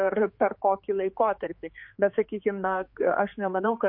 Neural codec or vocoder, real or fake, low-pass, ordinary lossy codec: codec, 16 kHz, 4 kbps, X-Codec, HuBERT features, trained on general audio; fake; 5.4 kHz; MP3, 32 kbps